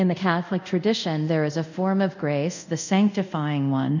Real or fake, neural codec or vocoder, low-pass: fake; codec, 24 kHz, 0.5 kbps, DualCodec; 7.2 kHz